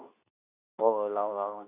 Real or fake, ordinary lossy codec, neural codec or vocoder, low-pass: fake; none; codec, 24 kHz, 0.9 kbps, WavTokenizer, medium speech release version 2; 3.6 kHz